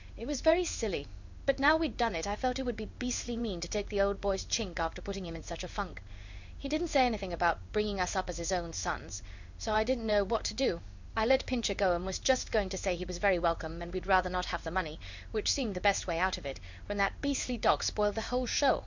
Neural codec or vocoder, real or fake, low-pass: codec, 16 kHz in and 24 kHz out, 1 kbps, XY-Tokenizer; fake; 7.2 kHz